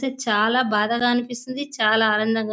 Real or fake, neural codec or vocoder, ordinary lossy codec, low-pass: real; none; none; none